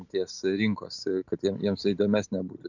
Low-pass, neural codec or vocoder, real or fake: 7.2 kHz; none; real